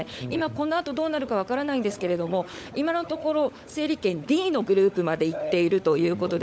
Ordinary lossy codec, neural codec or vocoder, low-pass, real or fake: none; codec, 16 kHz, 16 kbps, FunCodec, trained on LibriTTS, 50 frames a second; none; fake